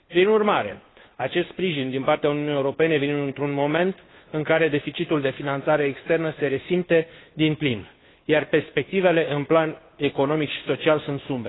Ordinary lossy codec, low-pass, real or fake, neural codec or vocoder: AAC, 16 kbps; 7.2 kHz; fake; codec, 16 kHz, 2 kbps, FunCodec, trained on Chinese and English, 25 frames a second